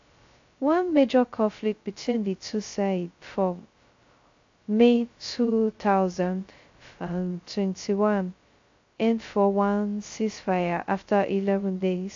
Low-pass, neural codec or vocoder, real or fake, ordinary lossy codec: 7.2 kHz; codec, 16 kHz, 0.2 kbps, FocalCodec; fake; AAC, 48 kbps